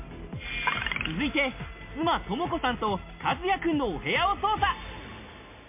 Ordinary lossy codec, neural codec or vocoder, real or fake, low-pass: MP3, 32 kbps; none; real; 3.6 kHz